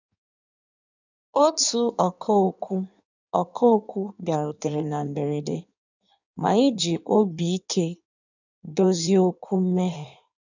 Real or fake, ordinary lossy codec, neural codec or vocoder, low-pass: fake; none; codec, 16 kHz in and 24 kHz out, 1.1 kbps, FireRedTTS-2 codec; 7.2 kHz